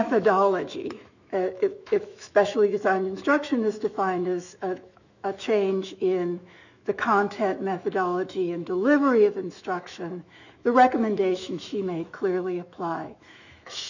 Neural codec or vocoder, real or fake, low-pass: autoencoder, 48 kHz, 128 numbers a frame, DAC-VAE, trained on Japanese speech; fake; 7.2 kHz